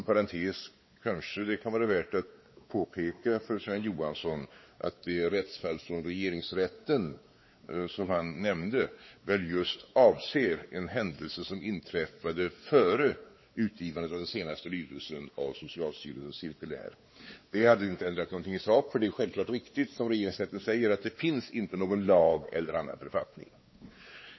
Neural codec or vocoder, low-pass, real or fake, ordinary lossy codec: codec, 16 kHz, 4 kbps, X-Codec, WavLM features, trained on Multilingual LibriSpeech; 7.2 kHz; fake; MP3, 24 kbps